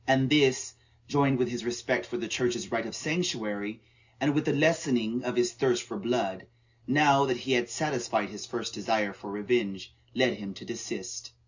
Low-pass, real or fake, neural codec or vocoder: 7.2 kHz; real; none